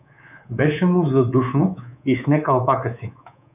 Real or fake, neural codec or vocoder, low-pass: fake; codec, 24 kHz, 3.1 kbps, DualCodec; 3.6 kHz